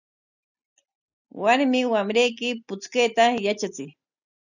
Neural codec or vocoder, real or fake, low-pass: none; real; 7.2 kHz